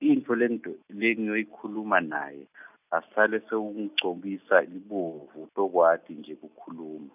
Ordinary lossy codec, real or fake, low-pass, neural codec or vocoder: none; real; 3.6 kHz; none